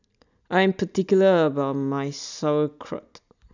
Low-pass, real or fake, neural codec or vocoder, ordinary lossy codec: 7.2 kHz; real; none; none